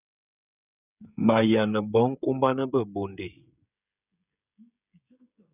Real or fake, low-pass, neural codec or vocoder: fake; 3.6 kHz; codec, 16 kHz, 16 kbps, FreqCodec, smaller model